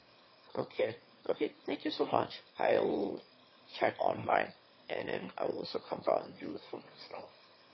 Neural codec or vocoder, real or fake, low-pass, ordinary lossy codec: autoencoder, 22.05 kHz, a latent of 192 numbers a frame, VITS, trained on one speaker; fake; 7.2 kHz; MP3, 24 kbps